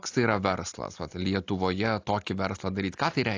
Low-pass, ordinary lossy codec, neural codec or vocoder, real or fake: 7.2 kHz; AAC, 48 kbps; none; real